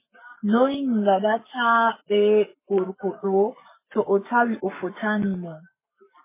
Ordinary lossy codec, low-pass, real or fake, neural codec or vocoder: MP3, 16 kbps; 3.6 kHz; fake; codec, 44.1 kHz, 7.8 kbps, Pupu-Codec